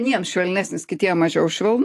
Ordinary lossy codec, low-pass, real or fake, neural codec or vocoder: AAC, 64 kbps; 14.4 kHz; real; none